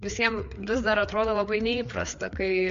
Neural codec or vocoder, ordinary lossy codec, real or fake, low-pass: codec, 16 kHz, 4 kbps, FreqCodec, larger model; MP3, 48 kbps; fake; 7.2 kHz